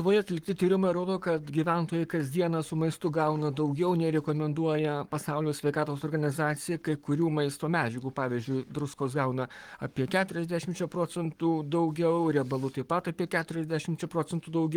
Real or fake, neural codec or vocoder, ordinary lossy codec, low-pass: fake; codec, 44.1 kHz, 7.8 kbps, Pupu-Codec; Opus, 24 kbps; 19.8 kHz